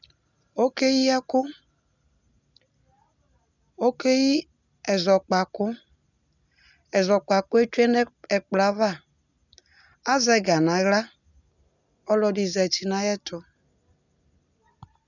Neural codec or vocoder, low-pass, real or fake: none; 7.2 kHz; real